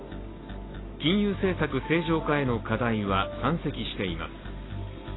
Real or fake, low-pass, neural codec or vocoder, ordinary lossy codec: real; 7.2 kHz; none; AAC, 16 kbps